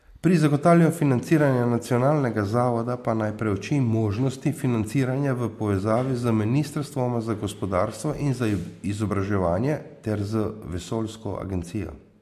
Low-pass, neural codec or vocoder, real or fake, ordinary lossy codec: 14.4 kHz; none; real; MP3, 64 kbps